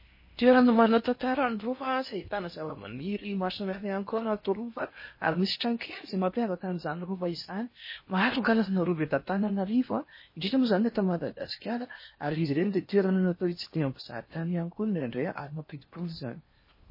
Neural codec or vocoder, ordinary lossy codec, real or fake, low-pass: codec, 16 kHz in and 24 kHz out, 0.8 kbps, FocalCodec, streaming, 65536 codes; MP3, 24 kbps; fake; 5.4 kHz